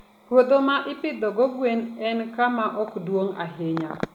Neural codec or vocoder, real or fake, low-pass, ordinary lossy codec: none; real; 19.8 kHz; none